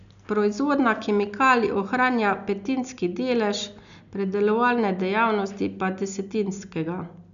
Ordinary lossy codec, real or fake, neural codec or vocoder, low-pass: none; real; none; 7.2 kHz